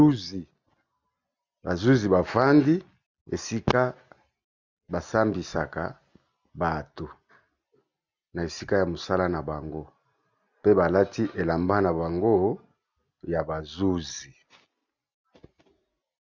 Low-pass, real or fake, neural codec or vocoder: 7.2 kHz; real; none